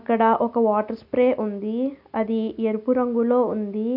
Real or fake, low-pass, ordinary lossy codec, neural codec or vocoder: real; 5.4 kHz; none; none